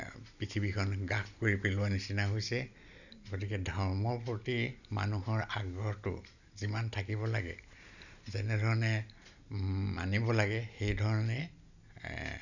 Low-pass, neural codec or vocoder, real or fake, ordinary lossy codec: 7.2 kHz; none; real; none